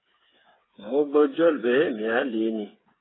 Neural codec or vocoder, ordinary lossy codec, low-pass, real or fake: codec, 16 kHz, 8 kbps, FreqCodec, smaller model; AAC, 16 kbps; 7.2 kHz; fake